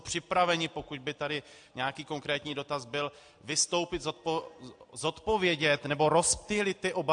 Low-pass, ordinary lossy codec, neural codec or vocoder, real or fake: 9.9 kHz; AAC, 64 kbps; none; real